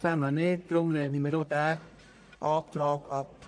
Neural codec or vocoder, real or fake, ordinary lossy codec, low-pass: codec, 44.1 kHz, 1.7 kbps, Pupu-Codec; fake; AAC, 64 kbps; 9.9 kHz